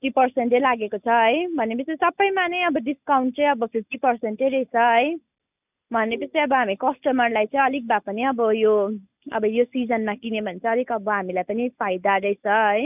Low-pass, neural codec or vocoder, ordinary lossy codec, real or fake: 3.6 kHz; none; none; real